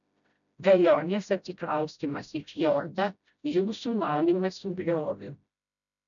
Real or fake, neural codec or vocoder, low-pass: fake; codec, 16 kHz, 0.5 kbps, FreqCodec, smaller model; 7.2 kHz